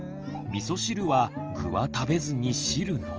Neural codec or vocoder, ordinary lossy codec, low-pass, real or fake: none; Opus, 24 kbps; 7.2 kHz; real